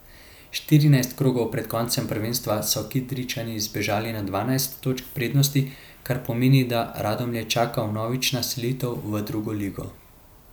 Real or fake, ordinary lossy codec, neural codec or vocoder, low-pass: real; none; none; none